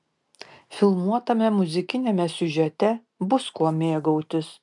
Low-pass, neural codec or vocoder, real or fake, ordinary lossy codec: 10.8 kHz; none; real; MP3, 96 kbps